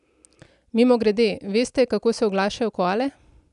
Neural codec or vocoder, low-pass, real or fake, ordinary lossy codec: none; 10.8 kHz; real; none